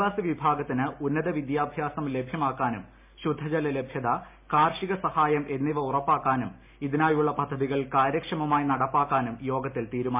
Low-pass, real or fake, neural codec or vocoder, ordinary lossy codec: 3.6 kHz; real; none; MP3, 32 kbps